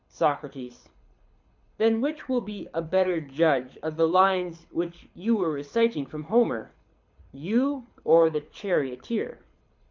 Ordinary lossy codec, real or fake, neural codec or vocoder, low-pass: MP3, 48 kbps; fake; codec, 24 kHz, 6 kbps, HILCodec; 7.2 kHz